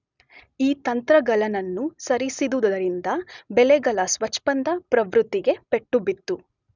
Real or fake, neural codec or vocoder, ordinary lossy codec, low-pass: real; none; none; 7.2 kHz